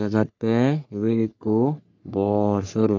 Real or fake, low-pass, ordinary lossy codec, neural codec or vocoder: fake; 7.2 kHz; none; codec, 44.1 kHz, 3.4 kbps, Pupu-Codec